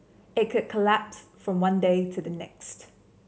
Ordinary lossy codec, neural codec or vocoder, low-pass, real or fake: none; none; none; real